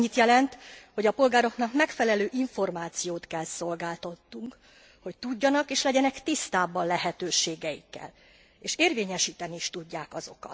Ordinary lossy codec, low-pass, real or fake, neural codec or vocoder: none; none; real; none